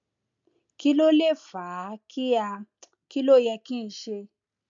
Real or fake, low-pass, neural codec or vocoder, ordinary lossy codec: real; 7.2 kHz; none; MP3, 64 kbps